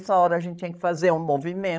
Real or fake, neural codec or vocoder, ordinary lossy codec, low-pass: fake; codec, 16 kHz, 16 kbps, FreqCodec, larger model; none; none